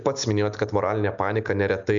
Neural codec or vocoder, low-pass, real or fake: none; 7.2 kHz; real